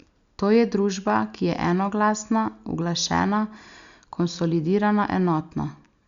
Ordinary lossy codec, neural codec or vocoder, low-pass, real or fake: Opus, 64 kbps; none; 7.2 kHz; real